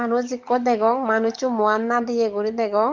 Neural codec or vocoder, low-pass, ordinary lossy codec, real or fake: codec, 44.1 kHz, 7.8 kbps, DAC; 7.2 kHz; Opus, 24 kbps; fake